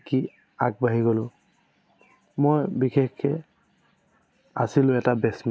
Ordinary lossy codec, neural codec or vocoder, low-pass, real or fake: none; none; none; real